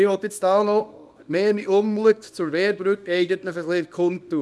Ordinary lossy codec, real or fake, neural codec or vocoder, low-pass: none; fake; codec, 24 kHz, 0.9 kbps, WavTokenizer, small release; none